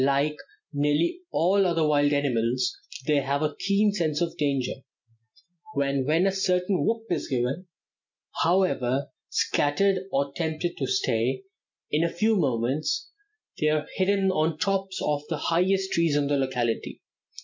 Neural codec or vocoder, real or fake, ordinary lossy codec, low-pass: none; real; MP3, 64 kbps; 7.2 kHz